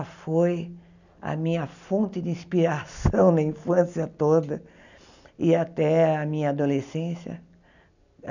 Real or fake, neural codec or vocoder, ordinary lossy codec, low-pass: real; none; none; 7.2 kHz